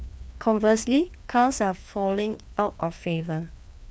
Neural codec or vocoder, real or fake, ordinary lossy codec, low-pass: codec, 16 kHz, 2 kbps, FreqCodec, larger model; fake; none; none